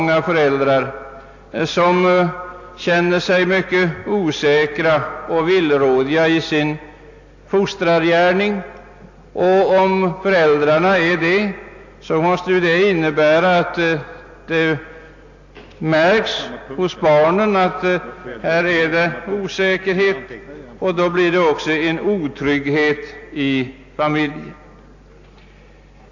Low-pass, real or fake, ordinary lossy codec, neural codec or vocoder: 7.2 kHz; real; AAC, 48 kbps; none